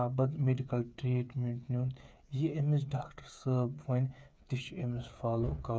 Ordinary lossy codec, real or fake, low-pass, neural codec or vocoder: none; fake; none; codec, 16 kHz, 8 kbps, FreqCodec, smaller model